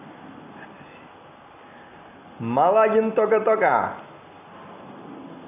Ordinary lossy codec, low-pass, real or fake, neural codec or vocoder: AAC, 32 kbps; 3.6 kHz; real; none